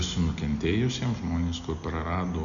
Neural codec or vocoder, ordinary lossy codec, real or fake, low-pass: none; MP3, 96 kbps; real; 7.2 kHz